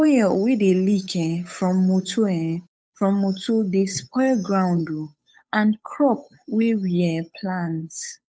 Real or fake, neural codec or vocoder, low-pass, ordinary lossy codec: fake; codec, 16 kHz, 8 kbps, FunCodec, trained on Chinese and English, 25 frames a second; none; none